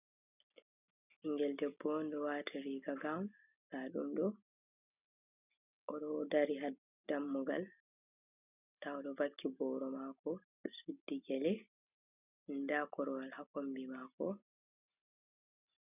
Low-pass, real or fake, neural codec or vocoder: 3.6 kHz; real; none